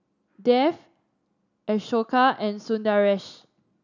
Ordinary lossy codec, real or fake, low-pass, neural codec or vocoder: none; real; 7.2 kHz; none